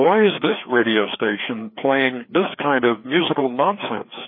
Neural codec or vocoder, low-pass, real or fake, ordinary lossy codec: codec, 16 kHz, 2 kbps, FreqCodec, larger model; 5.4 kHz; fake; MP3, 24 kbps